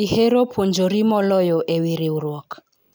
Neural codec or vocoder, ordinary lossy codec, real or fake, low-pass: none; none; real; none